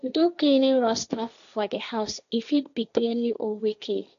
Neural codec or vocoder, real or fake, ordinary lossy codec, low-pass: codec, 16 kHz, 1.1 kbps, Voila-Tokenizer; fake; none; 7.2 kHz